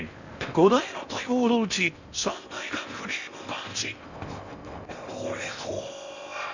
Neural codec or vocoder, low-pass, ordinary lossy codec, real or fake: codec, 16 kHz in and 24 kHz out, 0.6 kbps, FocalCodec, streaming, 4096 codes; 7.2 kHz; none; fake